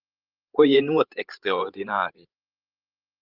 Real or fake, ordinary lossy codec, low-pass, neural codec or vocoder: fake; Opus, 32 kbps; 5.4 kHz; codec, 16 kHz, 16 kbps, FreqCodec, larger model